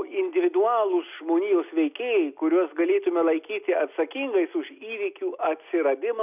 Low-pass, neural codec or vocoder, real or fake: 3.6 kHz; none; real